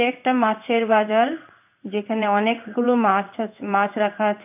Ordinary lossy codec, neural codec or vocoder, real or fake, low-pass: none; codec, 16 kHz in and 24 kHz out, 1 kbps, XY-Tokenizer; fake; 3.6 kHz